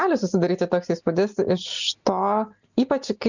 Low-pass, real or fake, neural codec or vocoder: 7.2 kHz; real; none